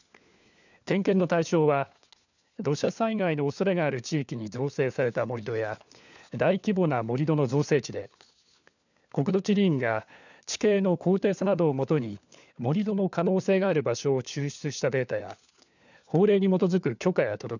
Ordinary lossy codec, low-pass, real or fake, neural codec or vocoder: none; 7.2 kHz; fake; codec, 16 kHz, 4 kbps, FunCodec, trained on LibriTTS, 50 frames a second